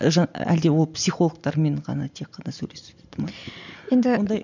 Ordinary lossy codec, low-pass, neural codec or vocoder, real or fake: none; 7.2 kHz; none; real